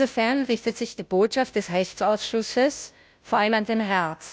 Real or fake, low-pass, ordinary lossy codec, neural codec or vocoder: fake; none; none; codec, 16 kHz, 0.5 kbps, FunCodec, trained on Chinese and English, 25 frames a second